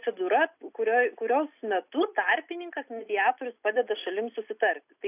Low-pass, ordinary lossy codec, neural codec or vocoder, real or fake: 3.6 kHz; AAC, 32 kbps; none; real